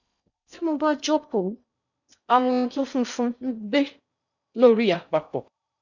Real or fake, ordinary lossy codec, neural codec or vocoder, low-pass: fake; none; codec, 16 kHz in and 24 kHz out, 0.6 kbps, FocalCodec, streaming, 4096 codes; 7.2 kHz